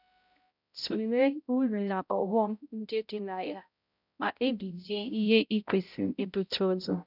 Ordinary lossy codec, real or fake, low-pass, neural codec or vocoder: none; fake; 5.4 kHz; codec, 16 kHz, 0.5 kbps, X-Codec, HuBERT features, trained on balanced general audio